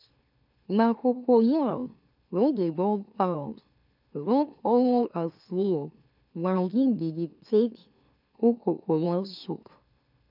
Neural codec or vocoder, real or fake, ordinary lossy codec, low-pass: autoencoder, 44.1 kHz, a latent of 192 numbers a frame, MeloTTS; fake; none; 5.4 kHz